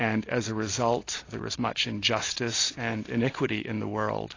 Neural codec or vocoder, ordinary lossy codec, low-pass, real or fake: none; AAC, 32 kbps; 7.2 kHz; real